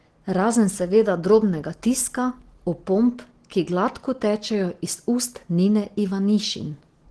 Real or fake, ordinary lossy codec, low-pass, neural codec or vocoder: real; Opus, 16 kbps; 10.8 kHz; none